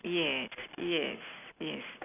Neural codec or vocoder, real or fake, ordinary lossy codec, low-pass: none; real; none; 3.6 kHz